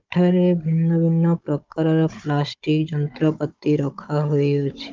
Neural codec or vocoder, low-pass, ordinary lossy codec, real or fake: codec, 16 kHz, 16 kbps, FunCodec, trained on Chinese and English, 50 frames a second; 7.2 kHz; Opus, 32 kbps; fake